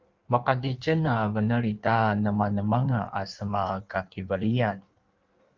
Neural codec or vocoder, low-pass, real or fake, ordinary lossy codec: codec, 16 kHz in and 24 kHz out, 1.1 kbps, FireRedTTS-2 codec; 7.2 kHz; fake; Opus, 24 kbps